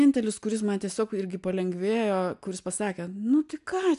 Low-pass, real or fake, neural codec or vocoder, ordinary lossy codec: 10.8 kHz; real; none; AAC, 96 kbps